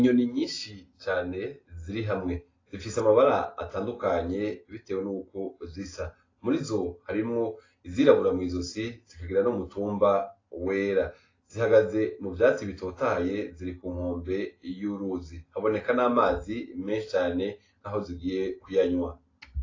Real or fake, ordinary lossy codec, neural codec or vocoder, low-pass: real; AAC, 32 kbps; none; 7.2 kHz